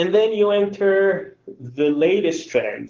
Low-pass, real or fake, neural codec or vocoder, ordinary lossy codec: 7.2 kHz; fake; codec, 44.1 kHz, 7.8 kbps, Pupu-Codec; Opus, 16 kbps